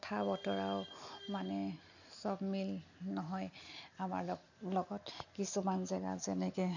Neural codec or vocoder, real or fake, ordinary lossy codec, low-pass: none; real; none; 7.2 kHz